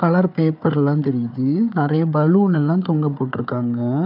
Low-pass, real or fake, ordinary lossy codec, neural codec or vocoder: 5.4 kHz; fake; none; codec, 16 kHz, 4 kbps, FunCodec, trained on Chinese and English, 50 frames a second